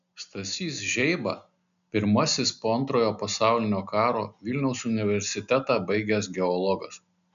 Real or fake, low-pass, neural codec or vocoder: real; 7.2 kHz; none